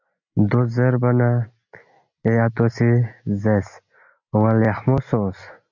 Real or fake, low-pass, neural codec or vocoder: real; 7.2 kHz; none